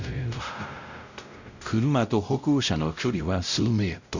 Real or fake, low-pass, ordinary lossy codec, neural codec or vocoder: fake; 7.2 kHz; none; codec, 16 kHz, 0.5 kbps, X-Codec, WavLM features, trained on Multilingual LibriSpeech